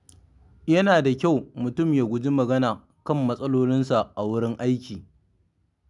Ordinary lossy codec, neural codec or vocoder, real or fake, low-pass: none; none; real; 10.8 kHz